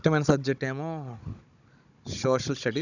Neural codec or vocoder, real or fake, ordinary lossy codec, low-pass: codec, 16 kHz, 16 kbps, FunCodec, trained on Chinese and English, 50 frames a second; fake; none; 7.2 kHz